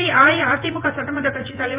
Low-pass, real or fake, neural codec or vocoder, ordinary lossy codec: 3.6 kHz; fake; vocoder, 24 kHz, 100 mel bands, Vocos; Opus, 32 kbps